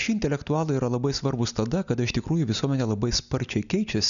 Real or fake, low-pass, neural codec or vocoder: real; 7.2 kHz; none